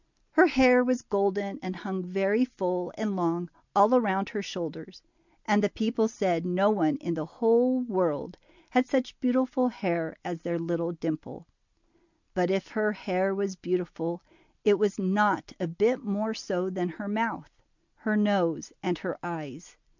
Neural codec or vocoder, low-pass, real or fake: none; 7.2 kHz; real